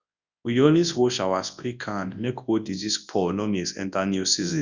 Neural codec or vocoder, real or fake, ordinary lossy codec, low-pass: codec, 24 kHz, 0.9 kbps, WavTokenizer, large speech release; fake; Opus, 64 kbps; 7.2 kHz